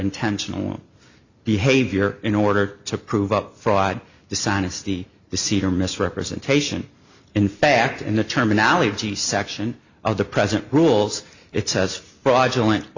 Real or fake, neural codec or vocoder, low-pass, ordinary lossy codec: real; none; 7.2 kHz; Opus, 64 kbps